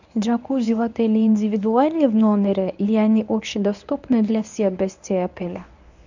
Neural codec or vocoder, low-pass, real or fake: codec, 24 kHz, 0.9 kbps, WavTokenizer, medium speech release version 1; 7.2 kHz; fake